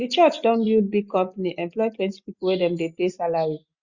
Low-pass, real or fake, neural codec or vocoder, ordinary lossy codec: 7.2 kHz; real; none; Opus, 64 kbps